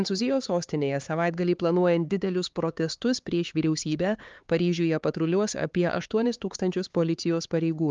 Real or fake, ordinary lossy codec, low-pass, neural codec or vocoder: fake; Opus, 32 kbps; 7.2 kHz; codec, 16 kHz, 4 kbps, X-Codec, HuBERT features, trained on LibriSpeech